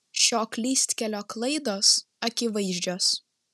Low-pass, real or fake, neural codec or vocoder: 14.4 kHz; real; none